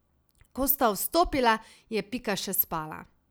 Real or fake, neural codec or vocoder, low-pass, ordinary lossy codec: real; none; none; none